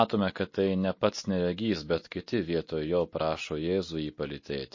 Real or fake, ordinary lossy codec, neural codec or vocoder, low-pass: real; MP3, 32 kbps; none; 7.2 kHz